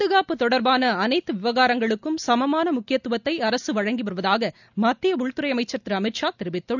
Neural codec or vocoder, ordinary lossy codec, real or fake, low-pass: none; none; real; 7.2 kHz